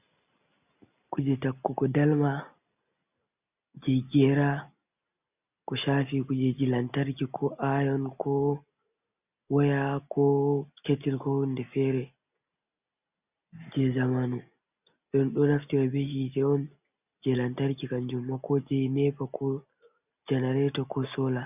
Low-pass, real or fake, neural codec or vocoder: 3.6 kHz; real; none